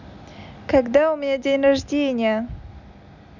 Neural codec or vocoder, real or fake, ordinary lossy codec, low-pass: none; real; none; 7.2 kHz